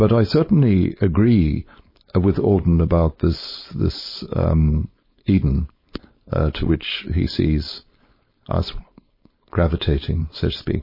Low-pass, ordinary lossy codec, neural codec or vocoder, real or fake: 5.4 kHz; MP3, 24 kbps; codec, 16 kHz, 4.8 kbps, FACodec; fake